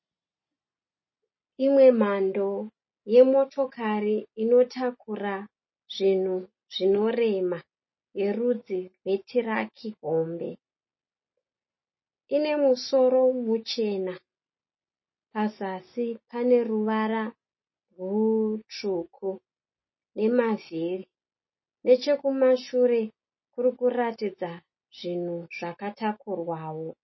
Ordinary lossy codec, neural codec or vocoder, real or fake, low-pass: MP3, 24 kbps; none; real; 7.2 kHz